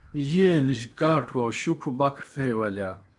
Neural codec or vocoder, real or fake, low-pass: codec, 16 kHz in and 24 kHz out, 0.8 kbps, FocalCodec, streaming, 65536 codes; fake; 10.8 kHz